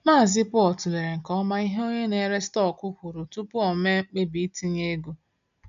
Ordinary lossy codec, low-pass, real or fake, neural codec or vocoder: MP3, 64 kbps; 7.2 kHz; real; none